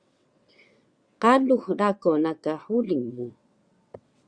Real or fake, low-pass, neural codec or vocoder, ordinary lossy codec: fake; 9.9 kHz; vocoder, 22.05 kHz, 80 mel bands, WaveNeXt; Opus, 64 kbps